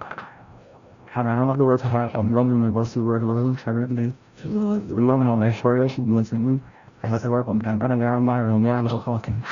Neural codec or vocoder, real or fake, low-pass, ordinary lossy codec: codec, 16 kHz, 0.5 kbps, FreqCodec, larger model; fake; 7.2 kHz; Opus, 64 kbps